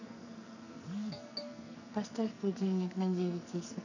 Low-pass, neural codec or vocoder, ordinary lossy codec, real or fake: 7.2 kHz; codec, 44.1 kHz, 2.6 kbps, SNAC; none; fake